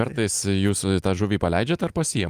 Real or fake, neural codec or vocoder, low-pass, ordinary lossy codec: real; none; 14.4 kHz; Opus, 32 kbps